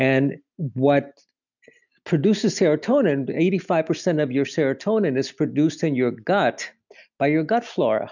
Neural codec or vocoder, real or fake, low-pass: none; real; 7.2 kHz